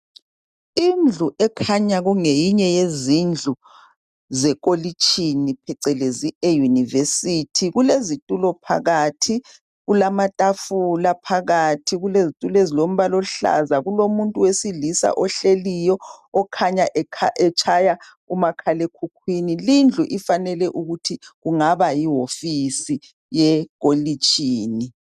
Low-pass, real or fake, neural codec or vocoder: 14.4 kHz; real; none